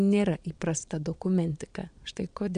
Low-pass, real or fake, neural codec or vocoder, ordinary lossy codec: 9.9 kHz; fake; vocoder, 22.05 kHz, 80 mel bands, Vocos; AAC, 96 kbps